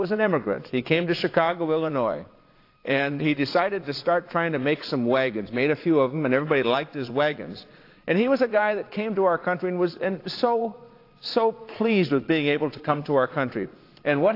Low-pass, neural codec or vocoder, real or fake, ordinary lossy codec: 5.4 kHz; vocoder, 22.05 kHz, 80 mel bands, Vocos; fake; AAC, 32 kbps